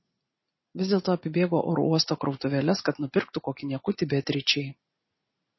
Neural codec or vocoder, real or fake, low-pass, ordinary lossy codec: none; real; 7.2 kHz; MP3, 24 kbps